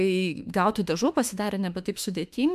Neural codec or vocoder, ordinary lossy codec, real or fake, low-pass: autoencoder, 48 kHz, 32 numbers a frame, DAC-VAE, trained on Japanese speech; MP3, 96 kbps; fake; 14.4 kHz